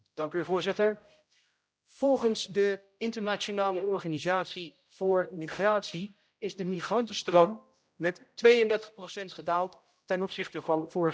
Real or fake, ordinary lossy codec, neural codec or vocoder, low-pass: fake; none; codec, 16 kHz, 0.5 kbps, X-Codec, HuBERT features, trained on general audio; none